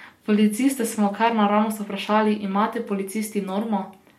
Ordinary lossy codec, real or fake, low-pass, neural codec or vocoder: MP3, 64 kbps; real; 19.8 kHz; none